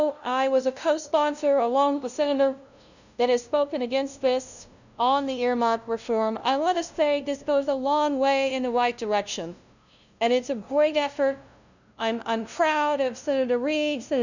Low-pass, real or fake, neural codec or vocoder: 7.2 kHz; fake; codec, 16 kHz, 0.5 kbps, FunCodec, trained on LibriTTS, 25 frames a second